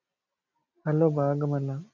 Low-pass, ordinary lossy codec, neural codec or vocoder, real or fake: 7.2 kHz; MP3, 64 kbps; none; real